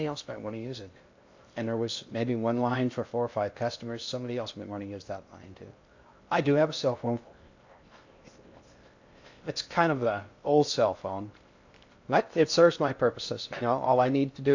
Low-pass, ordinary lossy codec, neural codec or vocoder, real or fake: 7.2 kHz; AAC, 48 kbps; codec, 16 kHz in and 24 kHz out, 0.6 kbps, FocalCodec, streaming, 2048 codes; fake